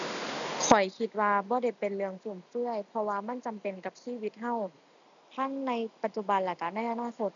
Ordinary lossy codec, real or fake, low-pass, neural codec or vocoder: none; real; 7.2 kHz; none